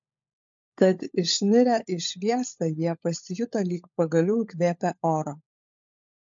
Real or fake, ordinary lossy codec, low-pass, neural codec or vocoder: fake; MP3, 48 kbps; 7.2 kHz; codec, 16 kHz, 16 kbps, FunCodec, trained on LibriTTS, 50 frames a second